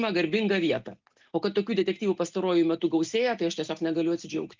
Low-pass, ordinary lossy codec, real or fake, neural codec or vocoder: 7.2 kHz; Opus, 24 kbps; real; none